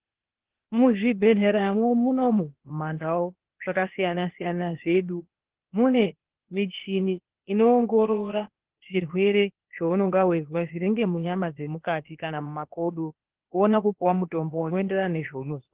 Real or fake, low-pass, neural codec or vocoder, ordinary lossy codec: fake; 3.6 kHz; codec, 16 kHz, 0.8 kbps, ZipCodec; Opus, 16 kbps